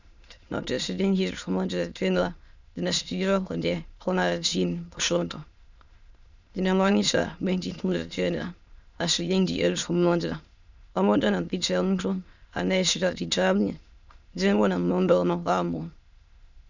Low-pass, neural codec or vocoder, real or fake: 7.2 kHz; autoencoder, 22.05 kHz, a latent of 192 numbers a frame, VITS, trained on many speakers; fake